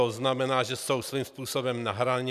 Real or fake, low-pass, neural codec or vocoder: real; 14.4 kHz; none